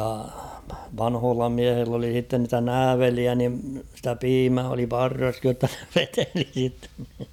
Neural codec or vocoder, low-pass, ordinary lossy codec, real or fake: none; 19.8 kHz; none; real